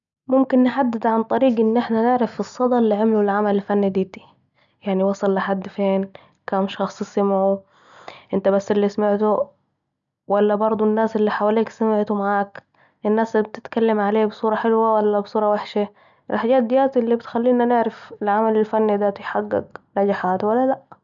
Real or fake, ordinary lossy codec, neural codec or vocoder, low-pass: real; none; none; 7.2 kHz